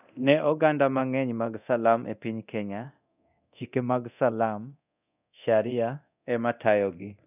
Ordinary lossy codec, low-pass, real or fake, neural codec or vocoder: none; 3.6 kHz; fake; codec, 24 kHz, 0.9 kbps, DualCodec